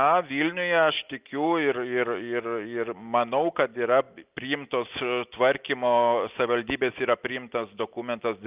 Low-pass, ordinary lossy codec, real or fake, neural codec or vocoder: 3.6 kHz; Opus, 24 kbps; real; none